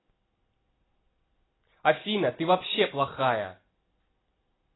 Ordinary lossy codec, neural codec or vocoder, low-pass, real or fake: AAC, 16 kbps; none; 7.2 kHz; real